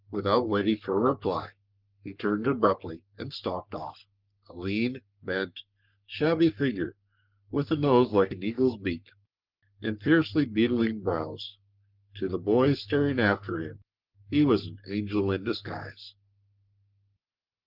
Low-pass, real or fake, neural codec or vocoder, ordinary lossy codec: 5.4 kHz; fake; codec, 44.1 kHz, 3.4 kbps, Pupu-Codec; Opus, 24 kbps